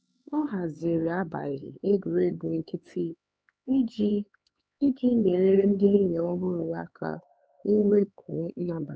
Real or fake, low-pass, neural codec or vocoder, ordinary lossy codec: fake; none; codec, 16 kHz, 4 kbps, X-Codec, HuBERT features, trained on LibriSpeech; none